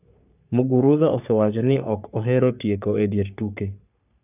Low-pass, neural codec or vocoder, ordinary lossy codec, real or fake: 3.6 kHz; codec, 44.1 kHz, 3.4 kbps, Pupu-Codec; none; fake